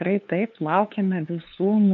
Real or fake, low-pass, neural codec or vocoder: fake; 7.2 kHz; codec, 16 kHz, 2 kbps, FunCodec, trained on LibriTTS, 25 frames a second